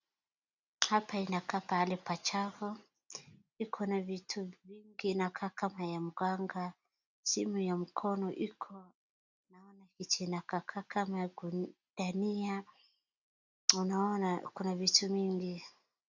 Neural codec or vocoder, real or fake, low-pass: none; real; 7.2 kHz